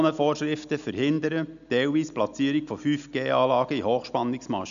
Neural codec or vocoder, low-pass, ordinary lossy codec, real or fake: none; 7.2 kHz; none; real